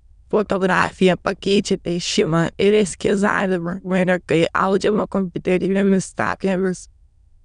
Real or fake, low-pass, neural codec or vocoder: fake; 9.9 kHz; autoencoder, 22.05 kHz, a latent of 192 numbers a frame, VITS, trained on many speakers